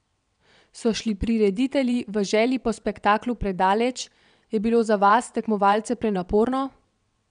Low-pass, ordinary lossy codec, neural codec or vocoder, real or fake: 9.9 kHz; none; vocoder, 22.05 kHz, 80 mel bands, WaveNeXt; fake